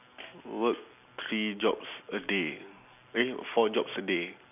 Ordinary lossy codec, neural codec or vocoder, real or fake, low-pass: none; none; real; 3.6 kHz